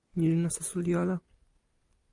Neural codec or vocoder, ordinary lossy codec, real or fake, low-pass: none; MP3, 96 kbps; real; 10.8 kHz